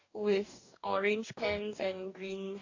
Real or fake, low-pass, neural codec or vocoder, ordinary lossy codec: fake; 7.2 kHz; codec, 44.1 kHz, 2.6 kbps, DAC; AAC, 48 kbps